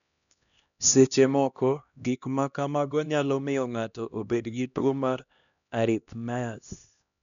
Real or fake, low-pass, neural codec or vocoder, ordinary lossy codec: fake; 7.2 kHz; codec, 16 kHz, 1 kbps, X-Codec, HuBERT features, trained on LibriSpeech; none